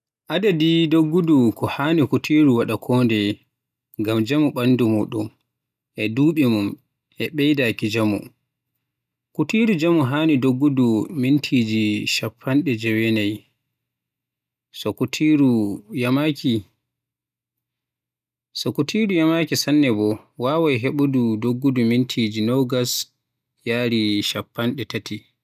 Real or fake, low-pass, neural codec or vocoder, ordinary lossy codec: real; 14.4 kHz; none; none